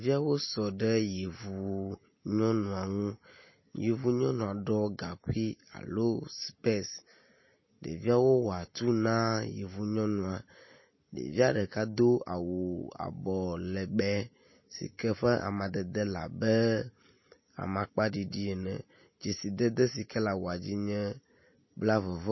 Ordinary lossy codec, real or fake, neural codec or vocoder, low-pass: MP3, 24 kbps; real; none; 7.2 kHz